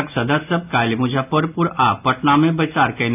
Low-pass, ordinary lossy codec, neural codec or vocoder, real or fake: 3.6 kHz; AAC, 32 kbps; none; real